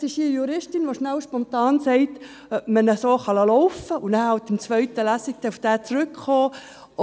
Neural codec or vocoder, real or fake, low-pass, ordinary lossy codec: none; real; none; none